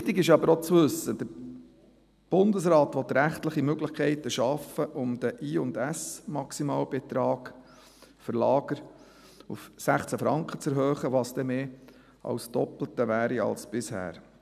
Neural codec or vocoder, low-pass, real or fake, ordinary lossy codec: none; 14.4 kHz; real; none